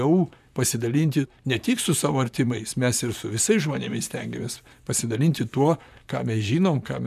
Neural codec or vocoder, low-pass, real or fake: vocoder, 44.1 kHz, 128 mel bands, Pupu-Vocoder; 14.4 kHz; fake